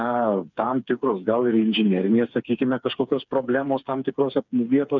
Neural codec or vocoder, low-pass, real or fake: codec, 16 kHz, 4 kbps, FreqCodec, smaller model; 7.2 kHz; fake